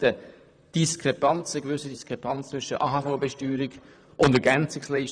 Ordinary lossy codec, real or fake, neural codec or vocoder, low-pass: none; fake; vocoder, 44.1 kHz, 128 mel bands, Pupu-Vocoder; 9.9 kHz